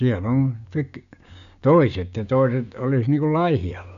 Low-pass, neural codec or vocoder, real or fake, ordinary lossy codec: 7.2 kHz; codec, 16 kHz, 6 kbps, DAC; fake; none